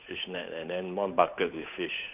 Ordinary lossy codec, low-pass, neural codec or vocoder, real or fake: none; 3.6 kHz; codec, 16 kHz, 8 kbps, FunCodec, trained on Chinese and English, 25 frames a second; fake